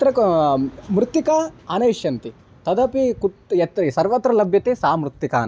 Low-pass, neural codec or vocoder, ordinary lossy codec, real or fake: none; none; none; real